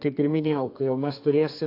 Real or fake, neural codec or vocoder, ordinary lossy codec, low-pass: fake; codec, 32 kHz, 1.9 kbps, SNAC; AAC, 32 kbps; 5.4 kHz